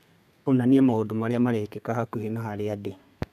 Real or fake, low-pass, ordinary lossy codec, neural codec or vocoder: fake; 14.4 kHz; none; codec, 32 kHz, 1.9 kbps, SNAC